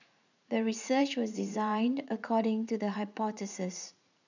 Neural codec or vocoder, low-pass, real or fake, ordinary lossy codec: vocoder, 44.1 kHz, 80 mel bands, Vocos; 7.2 kHz; fake; none